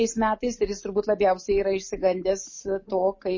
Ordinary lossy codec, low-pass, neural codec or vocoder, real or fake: MP3, 32 kbps; 7.2 kHz; none; real